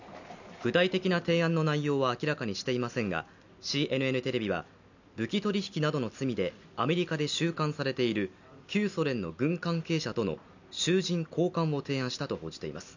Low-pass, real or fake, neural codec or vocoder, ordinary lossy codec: 7.2 kHz; real; none; none